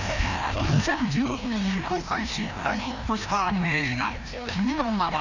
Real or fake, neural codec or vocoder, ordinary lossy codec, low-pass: fake; codec, 16 kHz, 1 kbps, FreqCodec, larger model; none; 7.2 kHz